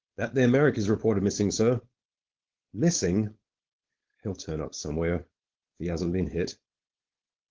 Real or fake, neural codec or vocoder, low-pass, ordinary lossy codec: fake; codec, 16 kHz, 4.8 kbps, FACodec; 7.2 kHz; Opus, 16 kbps